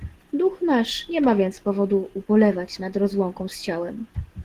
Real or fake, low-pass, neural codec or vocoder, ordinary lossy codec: real; 14.4 kHz; none; Opus, 16 kbps